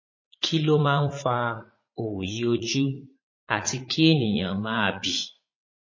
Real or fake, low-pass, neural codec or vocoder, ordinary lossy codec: fake; 7.2 kHz; vocoder, 44.1 kHz, 80 mel bands, Vocos; MP3, 32 kbps